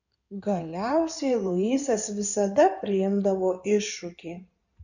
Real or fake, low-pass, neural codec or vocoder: fake; 7.2 kHz; codec, 16 kHz in and 24 kHz out, 2.2 kbps, FireRedTTS-2 codec